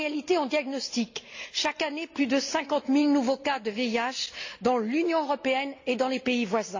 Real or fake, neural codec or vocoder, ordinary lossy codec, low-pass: real; none; AAC, 48 kbps; 7.2 kHz